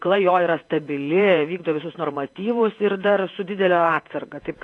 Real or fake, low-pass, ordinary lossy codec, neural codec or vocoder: fake; 10.8 kHz; AAC, 48 kbps; vocoder, 48 kHz, 128 mel bands, Vocos